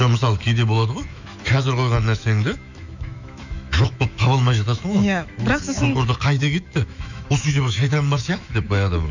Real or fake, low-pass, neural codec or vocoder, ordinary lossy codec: fake; 7.2 kHz; autoencoder, 48 kHz, 128 numbers a frame, DAC-VAE, trained on Japanese speech; none